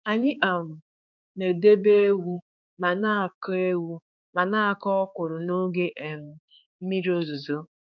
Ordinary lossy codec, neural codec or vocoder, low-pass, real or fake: none; codec, 16 kHz, 4 kbps, X-Codec, HuBERT features, trained on balanced general audio; 7.2 kHz; fake